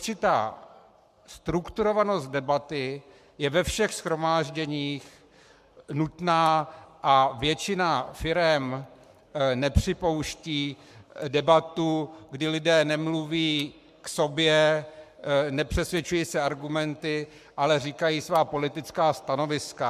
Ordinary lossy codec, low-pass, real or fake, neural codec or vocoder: MP3, 96 kbps; 14.4 kHz; fake; codec, 44.1 kHz, 7.8 kbps, Pupu-Codec